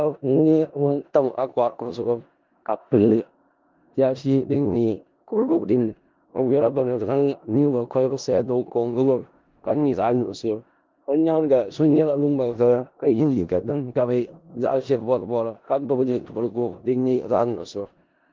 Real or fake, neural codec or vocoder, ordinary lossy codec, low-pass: fake; codec, 16 kHz in and 24 kHz out, 0.4 kbps, LongCat-Audio-Codec, four codebook decoder; Opus, 32 kbps; 7.2 kHz